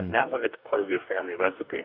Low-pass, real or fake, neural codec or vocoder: 5.4 kHz; fake; codec, 44.1 kHz, 2.6 kbps, DAC